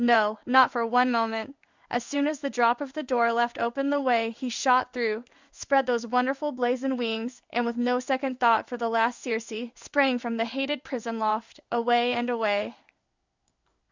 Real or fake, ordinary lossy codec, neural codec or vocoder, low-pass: fake; Opus, 64 kbps; codec, 16 kHz in and 24 kHz out, 1 kbps, XY-Tokenizer; 7.2 kHz